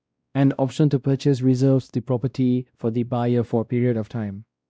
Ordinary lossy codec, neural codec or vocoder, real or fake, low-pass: none; codec, 16 kHz, 1 kbps, X-Codec, WavLM features, trained on Multilingual LibriSpeech; fake; none